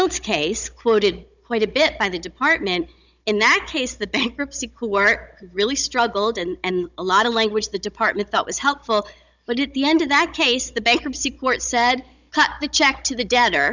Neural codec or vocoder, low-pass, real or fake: codec, 16 kHz, 16 kbps, FunCodec, trained on Chinese and English, 50 frames a second; 7.2 kHz; fake